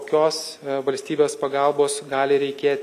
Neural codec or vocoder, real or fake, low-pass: none; real; 14.4 kHz